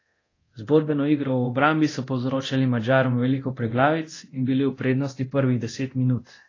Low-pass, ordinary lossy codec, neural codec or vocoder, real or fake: 7.2 kHz; AAC, 32 kbps; codec, 24 kHz, 0.9 kbps, DualCodec; fake